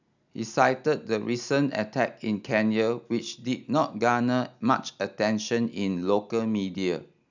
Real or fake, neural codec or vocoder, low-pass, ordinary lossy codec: real; none; 7.2 kHz; none